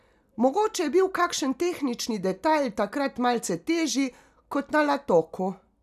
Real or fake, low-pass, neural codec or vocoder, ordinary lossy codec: real; 14.4 kHz; none; none